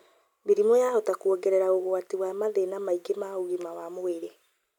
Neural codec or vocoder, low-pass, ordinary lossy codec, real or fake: none; 19.8 kHz; MP3, 96 kbps; real